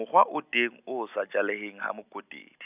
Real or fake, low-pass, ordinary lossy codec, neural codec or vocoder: real; 3.6 kHz; none; none